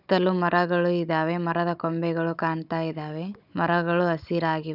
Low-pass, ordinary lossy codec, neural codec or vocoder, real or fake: 5.4 kHz; none; codec, 16 kHz, 16 kbps, FunCodec, trained on Chinese and English, 50 frames a second; fake